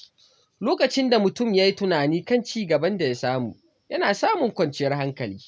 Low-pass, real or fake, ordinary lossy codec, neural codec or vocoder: none; real; none; none